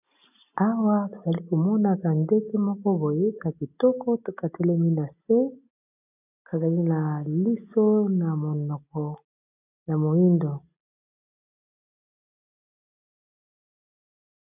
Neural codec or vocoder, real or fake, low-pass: none; real; 3.6 kHz